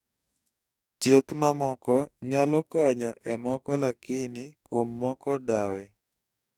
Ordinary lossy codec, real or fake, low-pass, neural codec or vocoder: none; fake; 19.8 kHz; codec, 44.1 kHz, 2.6 kbps, DAC